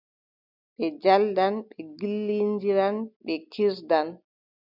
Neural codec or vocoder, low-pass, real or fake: none; 5.4 kHz; real